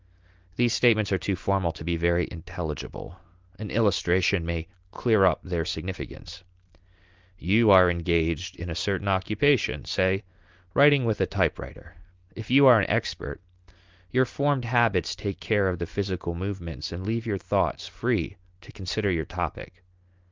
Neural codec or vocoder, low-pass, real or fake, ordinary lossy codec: none; 7.2 kHz; real; Opus, 32 kbps